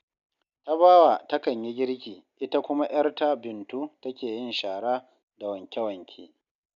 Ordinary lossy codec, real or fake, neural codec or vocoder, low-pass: none; real; none; 7.2 kHz